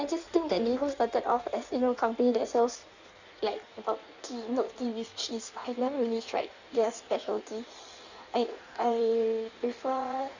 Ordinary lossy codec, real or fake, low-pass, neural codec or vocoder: none; fake; 7.2 kHz; codec, 16 kHz in and 24 kHz out, 1.1 kbps, FireRedTTS-2 codec